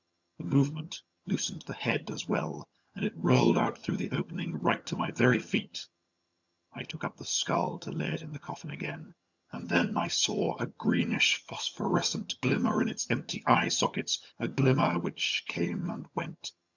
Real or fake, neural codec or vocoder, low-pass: fake; vocoder, 22.05 kHz, 80 mel bands, HiFi-GAN; 7.2 kHz